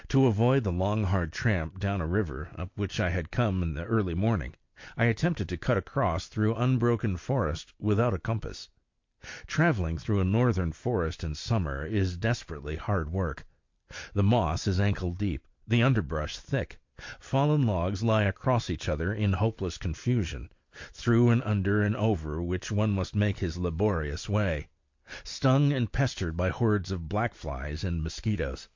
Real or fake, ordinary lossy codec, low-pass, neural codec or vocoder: real; MP3, 48 kbps; 7.2 kHz; none